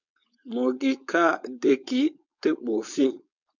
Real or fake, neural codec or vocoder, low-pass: fake; codec, 16 kHz, 4.8 kbps, FACodec; 7.2 kHz